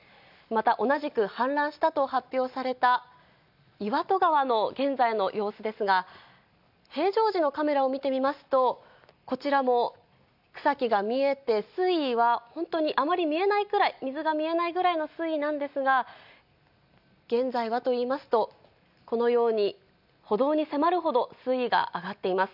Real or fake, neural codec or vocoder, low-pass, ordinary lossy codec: real; none; 5.4 kHz; none